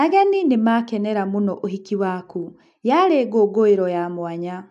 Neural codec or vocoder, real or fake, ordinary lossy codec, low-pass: none; real; none; 10.8 kHz